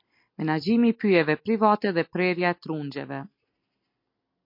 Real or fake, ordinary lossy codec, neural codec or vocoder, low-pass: real; MP3, 32 kbps; none; 5.4 kHz